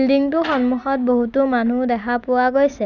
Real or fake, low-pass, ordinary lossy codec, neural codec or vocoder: real; 7.2 kHz; none; none